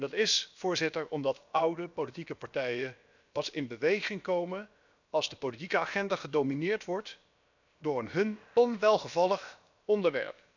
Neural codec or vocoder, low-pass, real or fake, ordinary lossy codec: codec, 16 kHz, about 1 kbps, DyCAST, with the encoder's durations; 7.2 kHz; fake; none